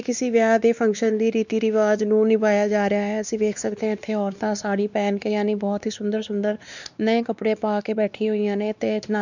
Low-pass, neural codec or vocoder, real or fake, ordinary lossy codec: 7.2 kHz; codec, 16 kHz, 2 kbps, X-Codec, WavLM features, trained on Multilingual LibriSpeech; fake; none